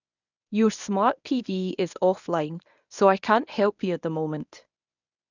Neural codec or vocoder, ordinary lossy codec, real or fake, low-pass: codec, 24 kHz, 0.9 kbps, WavTokenizer, medium speech release version 1; none; fake; 7.2 kHz